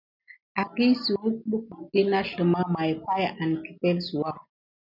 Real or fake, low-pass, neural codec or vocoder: real; 5.4 kHz; none